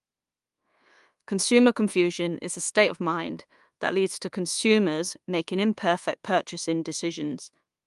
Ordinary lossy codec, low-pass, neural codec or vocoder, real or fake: Opus, 32 kbps; 10.8 kHz; codec, 24 kHz, 1.2 kbps, DualCodec; fake